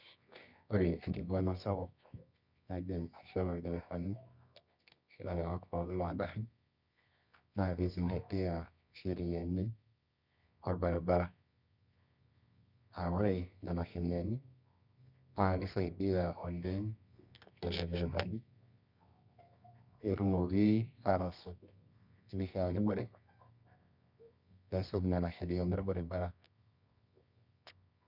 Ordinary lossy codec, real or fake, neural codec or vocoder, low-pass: none; fake; codec, 24 kHz, 0.9 kbps, WavTokenizer, medium music audio release; 5.4 kHz